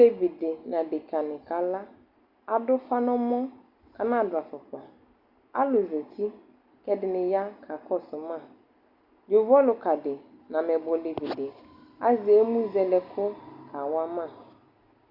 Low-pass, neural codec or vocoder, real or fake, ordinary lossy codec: 5.4 kHz; none; real; Opus, 64 kbps